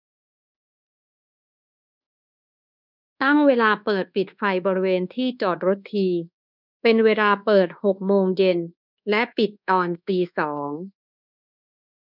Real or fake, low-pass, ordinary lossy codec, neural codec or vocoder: fake; 5.4 kHz; none; codec, 24 kHz, 1.2 kbps, DualCodec